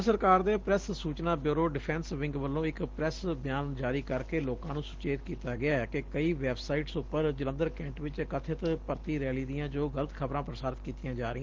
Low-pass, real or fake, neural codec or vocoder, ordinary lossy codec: 7.2 kHz; real; none; Opus, 16 kbps